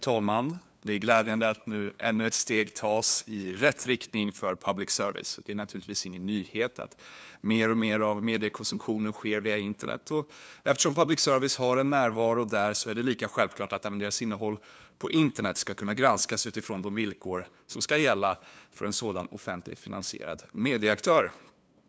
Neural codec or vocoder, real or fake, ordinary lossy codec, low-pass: codec, 16 kHz, 2 kbps, FunCodec, trained on LibriTTS, 25 frames a second; fake; none; none